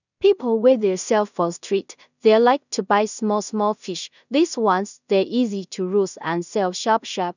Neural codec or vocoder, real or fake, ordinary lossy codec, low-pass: codec, 16 kHz in and 24 kHz out, 0.4 kbps, LongCat-Audio-Codec, two codebook decoder; fake; none; 7.2 kHz